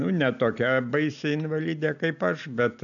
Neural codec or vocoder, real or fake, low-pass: none; real; 7.2 kHz